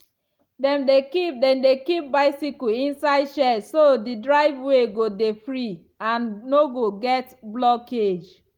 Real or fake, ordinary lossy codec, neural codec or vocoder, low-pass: real; Opus, 24 kbps; none; 19.8 kHz